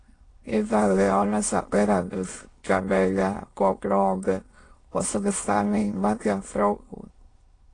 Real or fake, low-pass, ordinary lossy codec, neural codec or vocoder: fake; 9.9 kHz; AAC, 32 kbps; autoencoder, 22.05 kHz, a latent of 192 numbers a frame, VITS, trained on many speakers